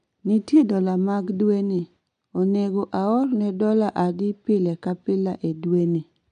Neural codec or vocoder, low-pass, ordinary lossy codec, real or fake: none; 9.9 kHz; none; real